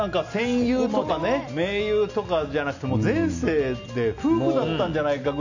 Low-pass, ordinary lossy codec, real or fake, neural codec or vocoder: 7.2 kHz; none; real; none